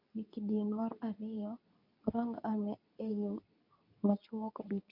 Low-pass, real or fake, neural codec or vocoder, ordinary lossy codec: 5.4 kHz; fake; codec, 24 kHz, 0.9 kbps, WavTokenizer, medium speech release version 2; none